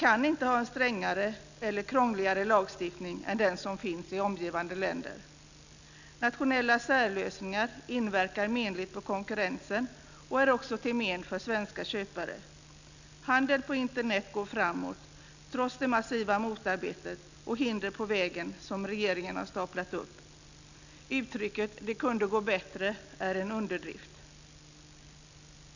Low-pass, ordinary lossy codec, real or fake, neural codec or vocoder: 7.2 kHz; none; real; none